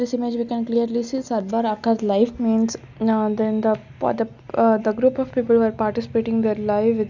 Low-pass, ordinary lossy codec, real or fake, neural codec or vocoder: 7.2 kHz; none; real; none